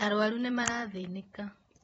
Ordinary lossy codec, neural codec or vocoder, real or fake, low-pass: AAC, 24 kbps; vocoder, 44.1 kHz, 128 mel bands every 512 samples, BigVGAN v2; fake; 19.8 kHz